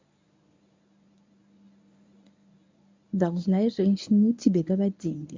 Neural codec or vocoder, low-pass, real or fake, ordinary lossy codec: codec, 24 kHz, 0.9 kbps, WavTokenizer, medium speech release version 1; 7.2 kHz; fake; MP3, 64 kbps